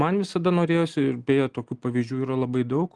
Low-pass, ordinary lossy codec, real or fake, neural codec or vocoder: 10.8 kHz; Opus, 24 kbps; real; none